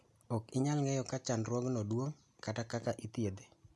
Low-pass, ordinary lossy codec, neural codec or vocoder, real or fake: none; none; none; real